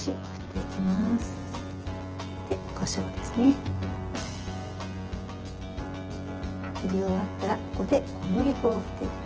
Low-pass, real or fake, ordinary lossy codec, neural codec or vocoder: 7.2 kHz; fake; Opus, 16 kbps; vocoder, 24 kHz, 100 mel bands, Vocos